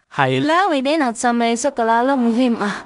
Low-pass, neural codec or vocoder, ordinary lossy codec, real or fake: 10.8 kHz; codec, 16 kHz in and 24 kHz out, 0.4 kbps, LongCat-Audio-Codec, two codebook decoder; none; fake